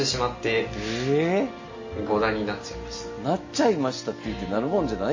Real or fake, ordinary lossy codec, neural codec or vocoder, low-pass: real; MP3, 32 kbps; none; 7.2 kHz